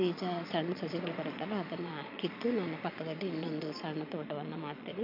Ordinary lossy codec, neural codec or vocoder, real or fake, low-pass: MP3, 48 kbps; vocoder, 22.05 kHz, 80 mel bands, Vocos; fake; 5.4 kHz